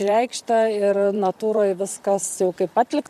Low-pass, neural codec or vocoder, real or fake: 14.4 kHz; vocoder, 44.1 kHz, 128 mel bands, Pupu-Vocoder; fake